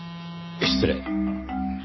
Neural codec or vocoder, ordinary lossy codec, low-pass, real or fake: none; MP3, 24 kbps; 7.2 kHz; real